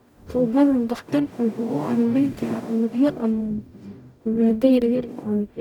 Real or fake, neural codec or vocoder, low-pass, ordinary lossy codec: fake; codec, 44.1 kHz, 0.9 kbps, DAC; 19.8 kHz; none